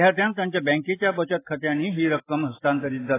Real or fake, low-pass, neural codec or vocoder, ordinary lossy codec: real; 3.6 kHz; none; AAC, 16 kbps